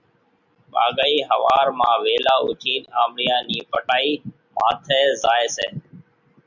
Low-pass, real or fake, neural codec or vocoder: 7.2 kHz; real; none